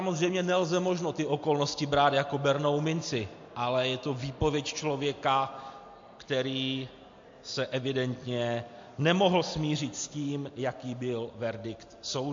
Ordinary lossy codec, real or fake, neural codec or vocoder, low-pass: MP3, 48 kbps; real; none; 7.2 kHz